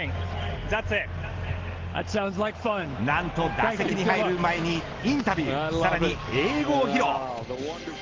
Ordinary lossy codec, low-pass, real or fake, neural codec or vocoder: Opus, 16 kbps; 7.2 kHz; real; none